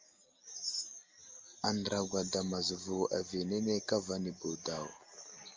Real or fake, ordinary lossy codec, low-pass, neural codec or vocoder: real; Opus, 32 kbps; 7.2 kHz; none